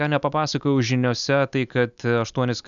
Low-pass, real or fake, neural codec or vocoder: 7.2 kHz; real; none